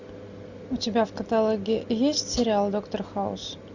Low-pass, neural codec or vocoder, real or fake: 7.2 kHz; none; real